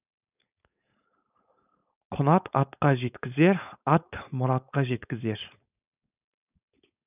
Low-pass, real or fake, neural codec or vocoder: 3.6 kHz; fake; codec, 16 kHz, 4.8 kbps, FACodec